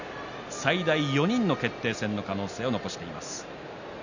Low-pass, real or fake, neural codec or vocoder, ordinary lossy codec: 7.2 kHz; real; none; none